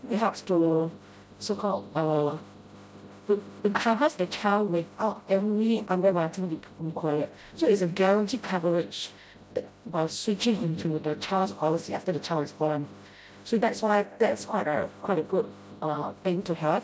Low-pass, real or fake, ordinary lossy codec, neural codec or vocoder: none; fake; none; codec, 16 kHz, 0.5 kbps, FreqCodec, smaller model